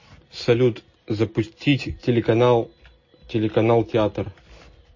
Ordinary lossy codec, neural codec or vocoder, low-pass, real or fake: MP3, 32 kbps; none; 7.2 kHz; real